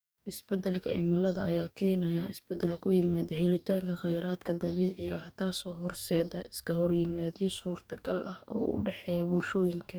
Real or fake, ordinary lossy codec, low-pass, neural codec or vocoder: fake; none; none; codec, 44.1 kHz, 2.6 kbps, DAC